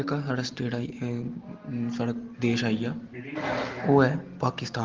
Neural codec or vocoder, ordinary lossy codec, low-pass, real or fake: none; Opus, 16 kbps; 7.2 kHz; real